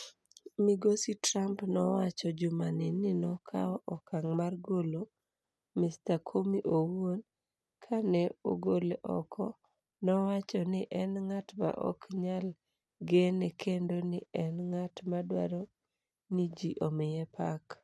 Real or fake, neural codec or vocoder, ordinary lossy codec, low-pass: real; none; none; none